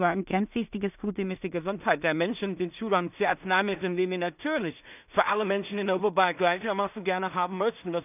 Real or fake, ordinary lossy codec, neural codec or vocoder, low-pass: fake; none; codec, 16 kHz in and 24 kHz out, 0.4 kbps, LongCat-Audio-Codec, two codebook decoder; 3.6 kHz